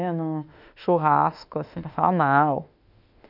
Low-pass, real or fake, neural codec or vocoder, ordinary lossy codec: 5.4 kHz; fake; autoencoder, 48 kHz, 32 numbers a frame, DAC-VAE, trained on Japanese speech; none